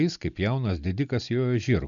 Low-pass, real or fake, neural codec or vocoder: 7.2 kHz; real; none